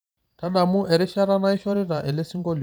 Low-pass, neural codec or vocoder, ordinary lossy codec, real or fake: none; vocoder, 44.1 kHz, 128 mel bands every 512 samples, BigVGAN v2; none; fake